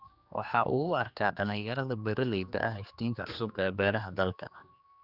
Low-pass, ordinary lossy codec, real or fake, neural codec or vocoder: 5.4 kHz; Opus, 64 kbps; fake; codec, 16 kHz, 2 kbps, X-Codec, HuBERT features, trained on general audio